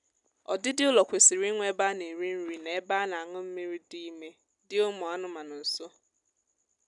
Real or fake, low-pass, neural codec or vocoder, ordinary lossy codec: real; 10.8 kHz; none; none